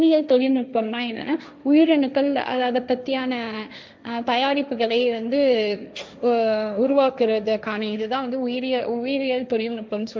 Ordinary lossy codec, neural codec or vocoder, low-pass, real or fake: none; codec, 16 kHz, 1.1 kbps, Voila-Tokenizer; none; fake